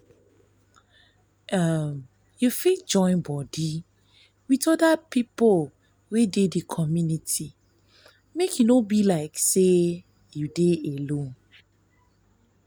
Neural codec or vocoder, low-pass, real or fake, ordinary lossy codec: none; none; real; none